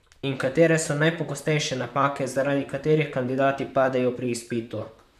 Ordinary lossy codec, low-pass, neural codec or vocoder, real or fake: none; 14.4 kHz; vocoder, 44.1 kHz, 128 mel bands, Pupu-Vocoder; fake